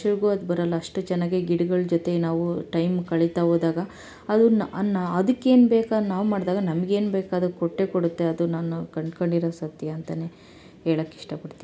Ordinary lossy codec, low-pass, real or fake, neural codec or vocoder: none; none; real; none